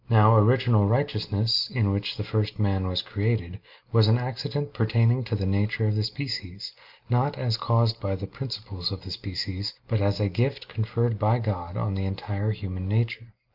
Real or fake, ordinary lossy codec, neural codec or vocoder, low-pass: real; Opus, 24 kbps; none; 5.4 kHz